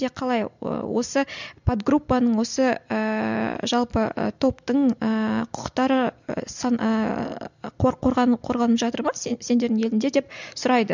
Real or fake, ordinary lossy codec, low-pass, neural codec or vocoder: real; none; 7.2 kHz; none